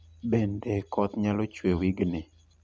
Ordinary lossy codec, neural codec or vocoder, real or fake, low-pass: none; none; real; none